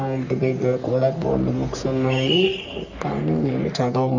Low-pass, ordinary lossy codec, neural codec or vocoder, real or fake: 7.2 kHz; none; codec, 44.1 kHz, 3.4 kbps, Pupu-Codec; fake